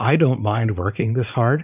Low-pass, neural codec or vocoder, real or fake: 3.6 kHz; vocoder, 44.1 kHz, 80 mel bands, Vocos; fake